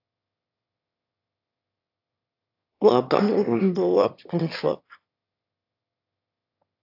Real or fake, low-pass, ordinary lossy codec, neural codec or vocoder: fake; 5.4 kHz; AAC, 48 kbps; autoencoder, 22.05 kHz, a latent of 192 numbers a frame, VITS, trained on one speaker